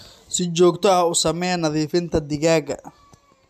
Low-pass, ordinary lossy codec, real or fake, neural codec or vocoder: 14.4 kHz; MP3, 96 kbps; real; none